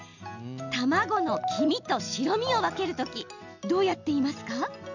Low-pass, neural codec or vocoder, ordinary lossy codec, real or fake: 7.2 kHz; none; none; real